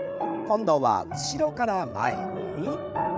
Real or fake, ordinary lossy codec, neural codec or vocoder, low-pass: fake; none; codec, 16 kHz, 8 kbps, FreqCodec, larger model; none